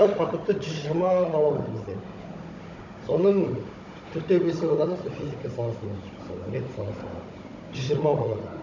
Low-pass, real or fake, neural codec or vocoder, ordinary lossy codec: 7.2 kHz; fake; codec, 16 kHz, 16 kbps, FunCodec, trained on Chinese and English, 50 frames a second; none